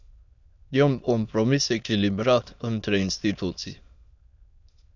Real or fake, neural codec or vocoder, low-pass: fake; autoencoder, 22.05 kHz, a latent of 192 numbers a frame, VITS, trained on many speakers; 7.2 kHz